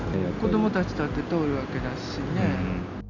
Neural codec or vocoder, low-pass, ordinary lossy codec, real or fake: none; 7.2 kHz; none; real